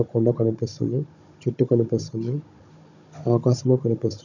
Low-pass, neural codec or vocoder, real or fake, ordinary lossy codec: 7.2 kHz; codec, 16 kHz, 16 kbps, FunCodec, trained on Chinese and English, 50 frames a second; fake; none